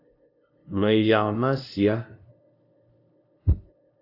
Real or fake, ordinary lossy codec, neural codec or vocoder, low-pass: fake; AAC, 32 kbps; codec, 16 kHz, 0.5 kbps, FunCodec, trained on LibriTTS, 25 frames a second; 5.4 kHz